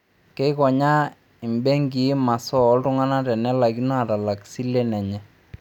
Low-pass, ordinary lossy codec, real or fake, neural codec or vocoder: 19.8 kHz; none; real; none